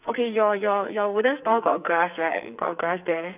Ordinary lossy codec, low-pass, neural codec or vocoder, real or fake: none; 3.6 kHz; codec, 44.1 kHz, 2.6 kbps, SNAC; fake